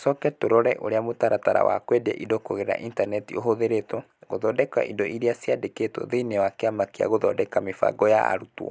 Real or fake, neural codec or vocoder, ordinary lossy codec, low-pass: real; none; none; none